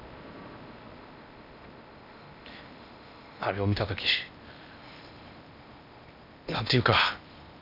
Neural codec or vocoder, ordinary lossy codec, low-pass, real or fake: codec, 16 kHz in and 24 kHz out, 0.8 kbps, FocalCodec, streaming, 65536 codes; none; 5.4 kHz; fake